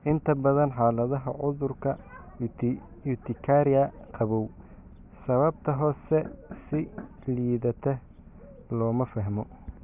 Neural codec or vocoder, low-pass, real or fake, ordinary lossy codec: none; 3.6 kHz; real; none